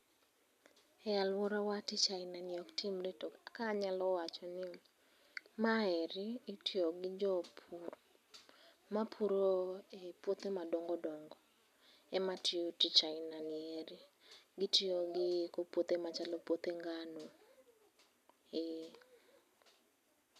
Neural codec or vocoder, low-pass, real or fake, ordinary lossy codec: none; 14.4 kHz; real; none